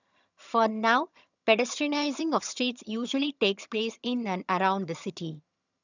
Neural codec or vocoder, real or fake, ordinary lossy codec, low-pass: vocoder, 22.05 kHz, 80 mel bands, HiFi-GAN; fake; none; 7.2 kHz